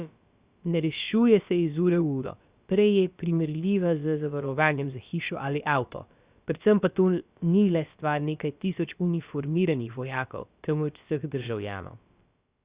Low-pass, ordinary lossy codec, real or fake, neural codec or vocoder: 3.6 kHz; Opus, 64 kbps; fake; codec, 16 kHz, about 1 kbps, DyCAST, with the encoder's durations